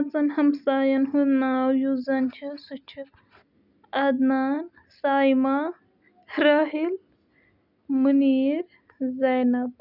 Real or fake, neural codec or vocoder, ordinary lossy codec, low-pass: real; none; none; 5.4 kHz